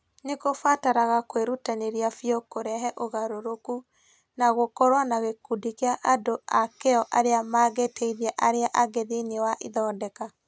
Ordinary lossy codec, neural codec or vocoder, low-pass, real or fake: none; none; none; real